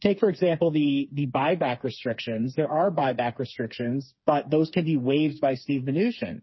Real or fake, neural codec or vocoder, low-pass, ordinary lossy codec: fake; codec, 16 kHz, 4 kbps, FreqCodec, smaller model; 7.2 kHz; MP3, 24 kbps